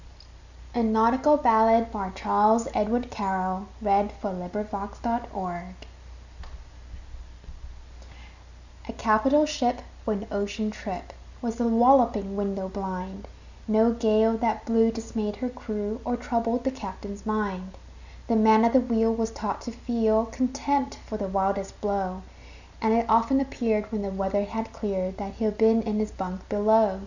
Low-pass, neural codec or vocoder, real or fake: 7.2 kHz; none; real